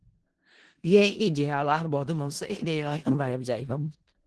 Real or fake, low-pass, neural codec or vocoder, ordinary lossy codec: fake; 10.8 kHz; codec, 16 kHz in and 24 kHz out, 0.4 kbps, LongCat-Audio-Codec, four codebook decoder; Opus, 16 kbps